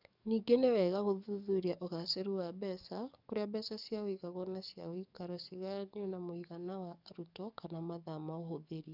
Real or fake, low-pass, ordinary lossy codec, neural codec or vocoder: fake; 5.4 kHz; none; codec, 44.1 kHz, 7.8 kbps, DAC